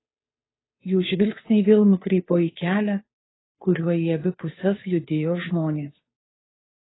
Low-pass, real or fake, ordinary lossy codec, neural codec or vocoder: 7.2 kHz; fake; AAC, 16 kbps; codec, 16 kHz, 2 kbps, FunCodec, trained on Chinese and English, 25 frames a second